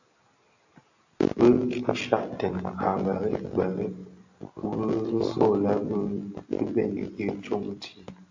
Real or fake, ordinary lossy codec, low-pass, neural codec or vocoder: real; AAC, 48 kbps; 7.2 kHz; none